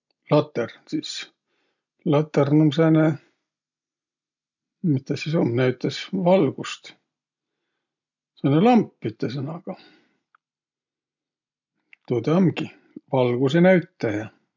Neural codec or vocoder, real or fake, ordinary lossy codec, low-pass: none; real; none; 7.2 kHz